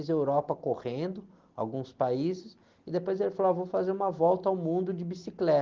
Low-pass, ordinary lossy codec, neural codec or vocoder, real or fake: 7.2 kHz; Opus, 16 kbps; none; real